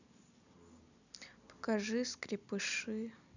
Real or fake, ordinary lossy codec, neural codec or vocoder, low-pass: real; none; none; 7.2 kHz